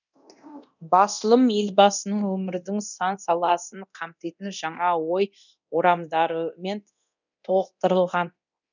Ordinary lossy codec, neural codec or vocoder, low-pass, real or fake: none; codec, 24 kHz, 0.9 kbps, DualCodec; 7.2 kHz; fake